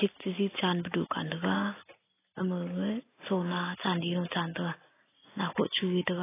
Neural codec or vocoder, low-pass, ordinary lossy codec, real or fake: none; 3.6 kHz; AAC, 16 kbps; real